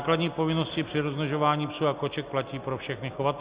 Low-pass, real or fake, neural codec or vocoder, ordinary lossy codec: 3.6 kHz; real; none; Opus, 64 kbps